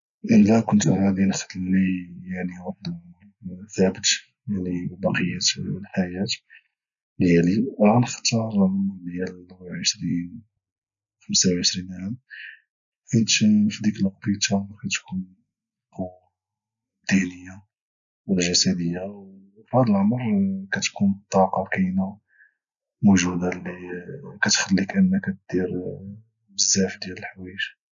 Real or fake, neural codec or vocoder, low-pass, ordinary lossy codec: real; none; 7.2 kHz; none